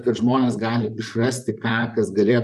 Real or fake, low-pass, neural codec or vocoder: fake; 14.4 kHz; vocoder, 44.1 kHz, 128 mel bands, Pupu-Vocoder